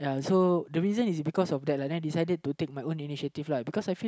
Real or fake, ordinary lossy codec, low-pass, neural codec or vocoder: real; none; none; none